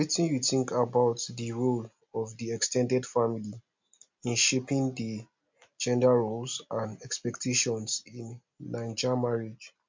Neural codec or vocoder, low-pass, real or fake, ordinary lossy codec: none; 7.2 kHz; real; MP3, 64 kbps